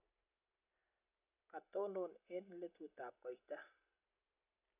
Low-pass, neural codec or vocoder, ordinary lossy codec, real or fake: 3.6 kHz; none; none; real